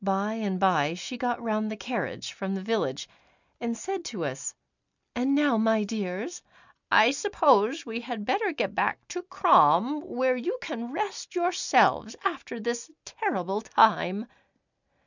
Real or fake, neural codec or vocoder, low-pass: real; none; 7.2 kHz